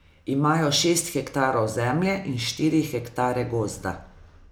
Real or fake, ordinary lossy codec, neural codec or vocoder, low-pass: real; none; none; none